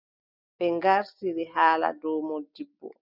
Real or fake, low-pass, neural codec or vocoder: real; 5.4 kHz; none